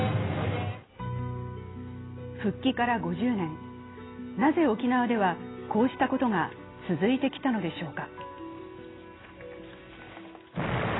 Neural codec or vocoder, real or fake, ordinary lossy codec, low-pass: none; real; AAC, 16 kbps; 7.2 kHz